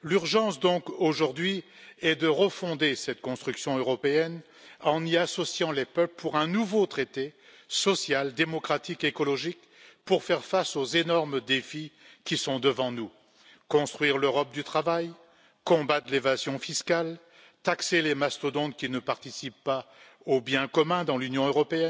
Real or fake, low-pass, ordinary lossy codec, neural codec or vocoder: real; none; none; none